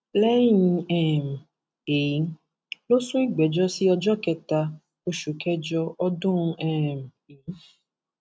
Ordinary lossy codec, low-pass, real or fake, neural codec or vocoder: none; none; real; none